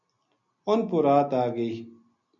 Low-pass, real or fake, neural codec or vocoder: 7.2 kHz; real; none